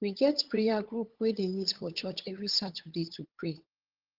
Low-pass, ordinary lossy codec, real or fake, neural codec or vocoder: 5.4 kHz; Opus, 16 kbps; fake; codec, 16 kHz, 8 kbps, FunCodec, trained on LibriTTS, 25 frames a second